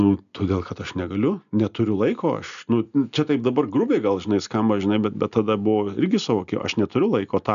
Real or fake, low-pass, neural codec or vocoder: real; 7.2 kHz; none